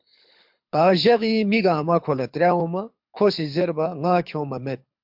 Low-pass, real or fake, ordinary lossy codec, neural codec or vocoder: 5.4 kHz; fake; MP3, 48 kbps; codec, 24 kHz, 6 kbps, HILCodec